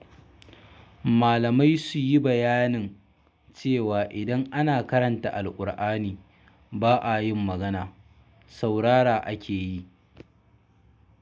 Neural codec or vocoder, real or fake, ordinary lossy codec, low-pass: none; real; none; none